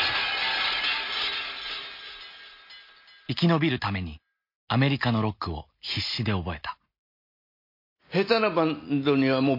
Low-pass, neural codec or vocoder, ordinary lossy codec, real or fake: 5.4 kHz; none; MP3, 32 kbps; real